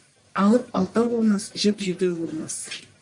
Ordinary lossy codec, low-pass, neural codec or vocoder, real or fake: MP3, 48 kbps; 10.8 kHz; codec, 44.1 kHz, 1.7 kbps, Pupu-Codec; fake